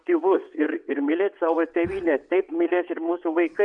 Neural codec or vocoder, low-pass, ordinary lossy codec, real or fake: vocoder, 22.05 kHz, 80 mel bands, WaveNeXt; 9.9 kHz; MP3, 64 kbps; fake